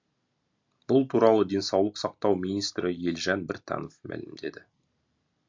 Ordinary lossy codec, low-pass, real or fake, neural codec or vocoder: MP3, 48 kbps; 7.2 kHz; real; none